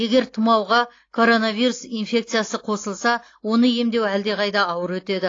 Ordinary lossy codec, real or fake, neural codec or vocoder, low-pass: AAC, 32 kbps; real; none; 7.2 kHz